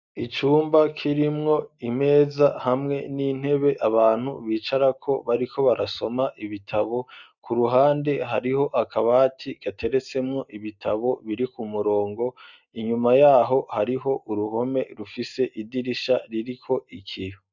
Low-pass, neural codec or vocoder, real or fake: 7.2 kHz; none; real